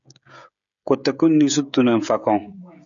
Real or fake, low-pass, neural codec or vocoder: fake; 7.2 kHz; codec, 16 kHz, 16 kbps, FreqCodec, smaller model